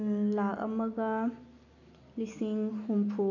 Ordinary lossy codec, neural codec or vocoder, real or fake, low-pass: none; none; real; 7.2 kHz